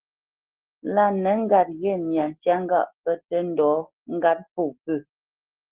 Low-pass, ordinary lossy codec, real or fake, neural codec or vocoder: 3.6 kHz; Opus, 16 kbps; real; none